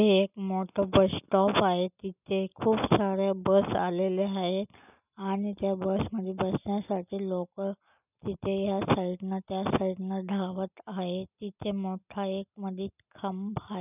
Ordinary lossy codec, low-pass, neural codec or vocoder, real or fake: none; 3.6 kHz; none; real